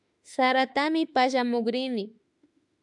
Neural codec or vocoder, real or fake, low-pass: autoencoder, 48 kHz, 32 numbers a frame, DAC-VAE, trained on Japanese speech; fake; 10.8 kHz